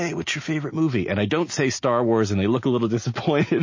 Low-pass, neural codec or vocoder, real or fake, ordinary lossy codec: 7.2 kHz; none; real; MP3, 32 kbps